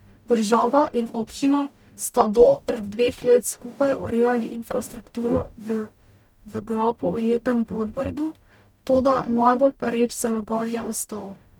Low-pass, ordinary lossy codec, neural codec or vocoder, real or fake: 19.8 kHz; none; codec, 44.1 kHz, 0.9 kbps, DAC; fake